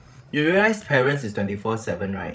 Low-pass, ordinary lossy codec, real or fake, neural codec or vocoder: none; none; fake; codec, 16 kHz, 16 kbps, FreqCodec, larger model